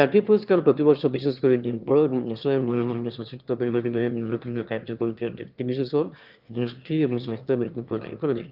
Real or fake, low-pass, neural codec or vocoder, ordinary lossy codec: fake; 5.4 kHz; autoencoder, 22.05 kHz, a latent of 192 numbers a frame, VITS, trained on one speaker; Opus, 24 kbps